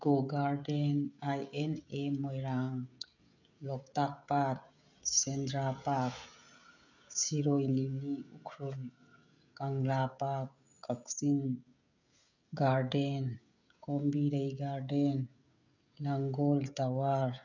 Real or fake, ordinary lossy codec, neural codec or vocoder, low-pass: fake; none; codec, 16 kHz, 16 kbps, FreqCodec, smaller model; 7.2 kHz